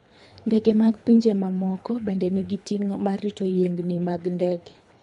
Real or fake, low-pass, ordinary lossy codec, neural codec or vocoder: fake; 10.8 kHz; none; codec, 24 kHz, 3 kbps, HILCodec